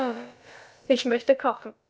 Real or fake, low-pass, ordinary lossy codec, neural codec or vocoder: fake; none; none; codec, 16 kHz, about 1 kbps, DyCAST, with the encoder's durations